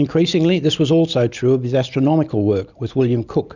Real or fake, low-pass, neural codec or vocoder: real; 7.2 kHz; none